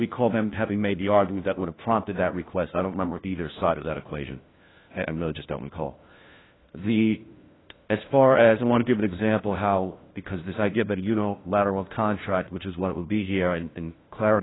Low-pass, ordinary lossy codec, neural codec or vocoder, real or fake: 7.2 kHz; AAC, 16 kbps; codec, 16 kHz, 1 kbps, FunCodec, trained on LibriTTS, 50 frames a second; fake